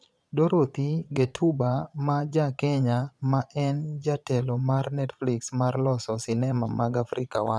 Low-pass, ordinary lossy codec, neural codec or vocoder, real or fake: none; none; vocoder, 22.05 kHz, 80 mel bands, Vocos; fake